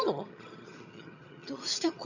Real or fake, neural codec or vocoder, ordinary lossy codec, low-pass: fake; vocoder, 22.05 kHz, 80 mel bands, HiFi-GAN; none; 7.2 kHz